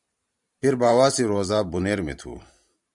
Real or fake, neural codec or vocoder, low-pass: fake; vocoder, 44.1 kHz, 128 mel bands every 512 samples, BigVGAN v2; 10.8 kHz